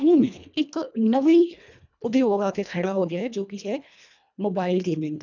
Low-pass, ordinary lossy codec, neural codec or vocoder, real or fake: 7.2 kHz; none; codec, 24 kHz, 1.5 kbps, HILCodec; fake